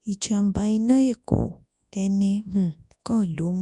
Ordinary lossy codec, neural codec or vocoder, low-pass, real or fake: none; codec, 24 kHz, 0.9 kbps, WavTokenizer, large speech release; 10.8 kHz; fake